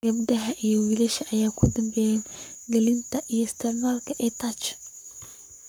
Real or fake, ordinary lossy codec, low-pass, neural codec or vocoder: fake; none; none; codec, 44.1 kHz, 7.8 kbps, Pupu-Codec